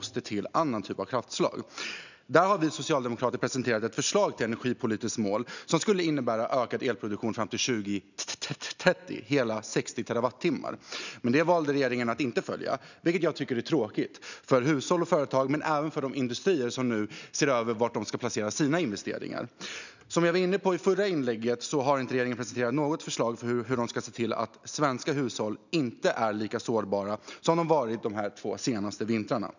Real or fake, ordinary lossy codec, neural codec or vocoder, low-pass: real; none; none; 7.2 kHz